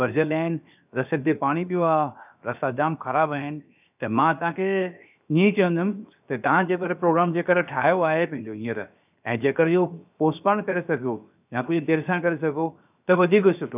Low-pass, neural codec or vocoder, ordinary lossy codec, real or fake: 3.6 kHz; codec, 16 kHz, 0.7 kbps, FocalCodec; none; fake